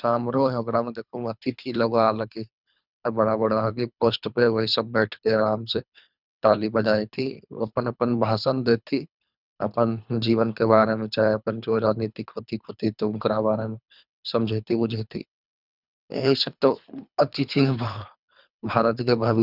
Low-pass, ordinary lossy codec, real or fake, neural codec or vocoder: 5.4 kHz; none; fake; codec, 24 kHz, 3 kbps, HILCodec